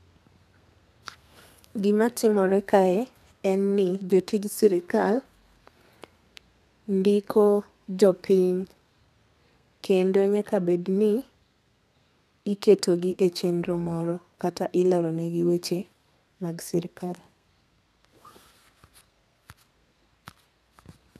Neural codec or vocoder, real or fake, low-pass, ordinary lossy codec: codec, 32 kHz, 1.9 kbps, SNAC; fake; 14.4 kHz; none